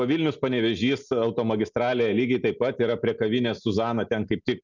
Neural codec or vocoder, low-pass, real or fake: none; 7.2 kHz; real